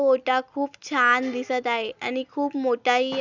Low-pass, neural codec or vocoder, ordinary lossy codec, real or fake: 7.2 kHz; none; none; real